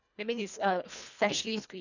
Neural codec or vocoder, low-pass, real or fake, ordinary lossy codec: codec, 24 kHz, 1.5 kbps, HILCodec; 7.2 kHz; fake; none